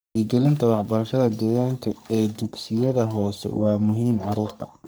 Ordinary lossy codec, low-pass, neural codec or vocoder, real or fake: none; none; codec, 44.1 kHz, 3.4 kbps, Pupu-Codec; fake